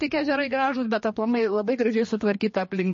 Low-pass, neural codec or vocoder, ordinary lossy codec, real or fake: 7.2 kHz; codec, 16 kHz, 2 kbps, X-Codec, HuBERT features, trained on general audio; MP3, 32 kbps; fake